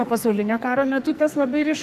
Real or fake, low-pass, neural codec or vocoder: fake; 14.4 kHz; codec, 44.1 kHz, 2.6 kbps, SNAC